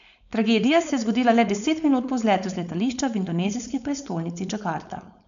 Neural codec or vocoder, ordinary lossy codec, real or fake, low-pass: codec, 16 kHz, 4.8 kbps, FACodec; none; fake; 7.2 kHz